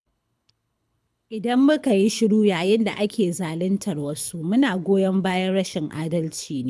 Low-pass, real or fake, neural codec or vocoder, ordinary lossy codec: none; fake; codec, 24 kHz, 6 kbps, HILCodec; none